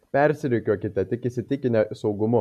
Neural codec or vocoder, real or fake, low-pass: none; real; 14.4 kHz